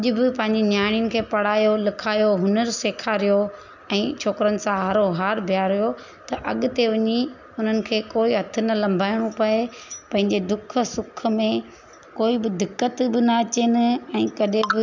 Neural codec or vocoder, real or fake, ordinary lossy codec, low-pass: none; real; none; 7.2 kHz